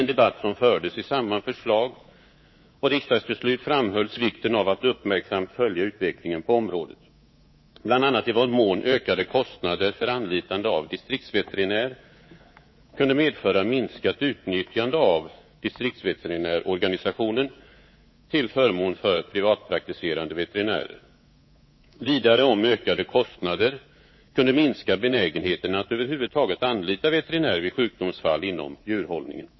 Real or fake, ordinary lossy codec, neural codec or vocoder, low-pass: fake; MP3, 24 kbps; codec, 24 kHz, 3.1 kbps, DualCodec; 7.2 kHz